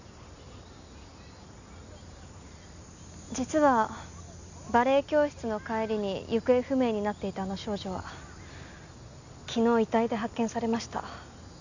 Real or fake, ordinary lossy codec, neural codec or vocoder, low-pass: real; none; none; 7.2 kHz